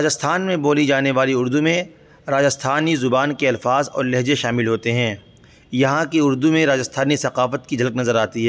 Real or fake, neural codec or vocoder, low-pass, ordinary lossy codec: real; none; none; none